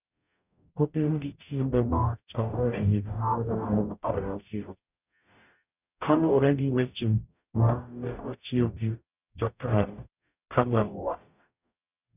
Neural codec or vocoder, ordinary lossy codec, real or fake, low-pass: codec, 44.1 kHz, 0.9 kbps, DAC; none; fake; 3.6 kHz